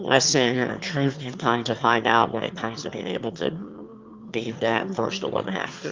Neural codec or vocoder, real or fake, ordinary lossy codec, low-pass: autoencoder, 22.05 kHz, a latent of 192 numbers a frame, VITS, trained on one speaker; fake; Opus, 32 kbps; 7.2 kHz